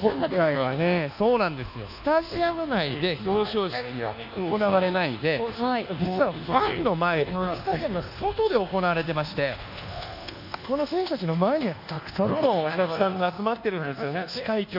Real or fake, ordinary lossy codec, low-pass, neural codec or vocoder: fake; none; 5.4 kHz; codec, 24 kHz, 1.2 kbps, DualCodec